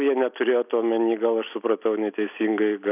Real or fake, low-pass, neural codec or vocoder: real; 3.6 kHz; none